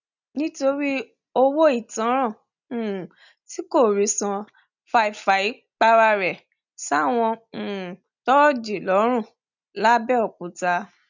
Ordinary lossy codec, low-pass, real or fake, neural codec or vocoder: none; 7.2 kHz; real; none